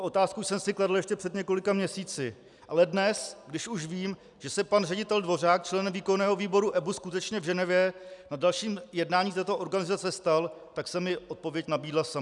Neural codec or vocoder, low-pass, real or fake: none; 10.8 kHz; real